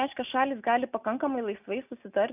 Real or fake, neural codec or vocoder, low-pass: real; none; 3.6 kHz